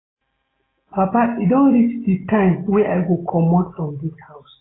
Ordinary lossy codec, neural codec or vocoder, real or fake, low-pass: AAC, 16 kbps; none; real; 7.2 kHz